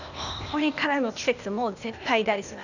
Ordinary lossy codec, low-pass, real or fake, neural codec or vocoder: none; 7.2 kHz; fake; codec, 16 kHz, 0.8 kbps, ZipCodec